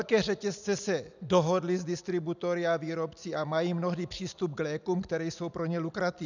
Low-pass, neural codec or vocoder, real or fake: 7.2 kHz; none; real